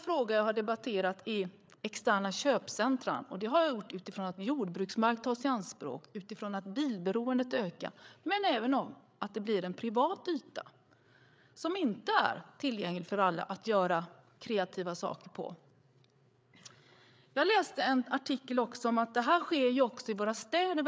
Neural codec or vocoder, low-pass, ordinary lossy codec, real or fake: codec, 16 kHz, 8 kbps, FreqCodec, larger model; none; none; fake